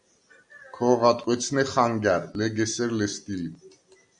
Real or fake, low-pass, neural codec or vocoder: real; 9.9 kHz; none